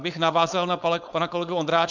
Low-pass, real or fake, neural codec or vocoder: 7.2 kHz; fake; codec, 16 kHz, 4.8 kbps, FACodec